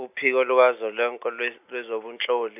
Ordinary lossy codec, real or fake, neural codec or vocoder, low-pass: AAC, 32 kbps; real; none; 3.6 kHz